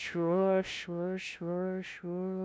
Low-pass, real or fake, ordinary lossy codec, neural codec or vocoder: none; fake; none; codec, 16 kHz, 0.5 kbps, FunCodec, trained on LibriTTS, 25 frames a second